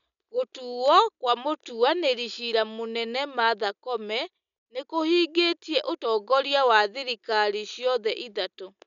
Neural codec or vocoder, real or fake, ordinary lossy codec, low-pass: none; real; none; 7.2 kHz